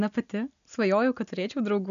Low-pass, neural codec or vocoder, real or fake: 7.2 kHz; none; real